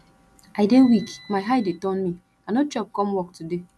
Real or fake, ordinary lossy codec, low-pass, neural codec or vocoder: real; none; none; none